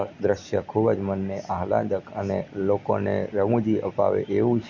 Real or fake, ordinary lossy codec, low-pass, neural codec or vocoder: real; none; 7.2 kHz; none